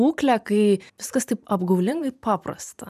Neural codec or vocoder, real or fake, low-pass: vocoder, 44.1 kHz, 128 mel bands every 512 samples, BigVGAN v2; fake; 14.4 kHz